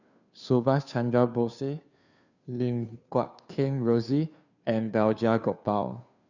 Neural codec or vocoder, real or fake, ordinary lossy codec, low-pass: codec, 16 kHz, 2 kbps, FunCodec, trained on Chinese and English, 25 frames a second; fake; none; 7.2 kHz